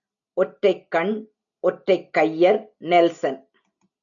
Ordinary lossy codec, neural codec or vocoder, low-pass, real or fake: MP3, 64 kbps; none; 7.2 kHz; real